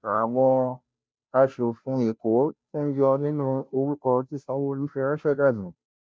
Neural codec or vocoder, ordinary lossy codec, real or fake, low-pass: codec, 16 kHz, 0.5 kbps, FunCodec, trained on Chinese and English, 25 frames a second; none; fake; none